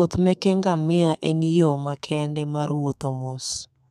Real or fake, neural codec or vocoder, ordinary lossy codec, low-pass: fake; codec, 32 kHz, 1.9 kbps, SNAC; none; 14.4 kHz